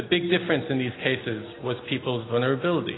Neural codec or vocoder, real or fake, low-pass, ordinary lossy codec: none; real; 7.2 kHz; AAC, 16 kbps